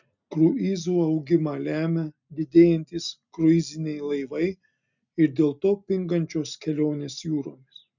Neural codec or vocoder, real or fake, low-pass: none; real; 7.2 kHz